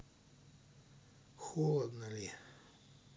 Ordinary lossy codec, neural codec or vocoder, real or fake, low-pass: none; none; real; none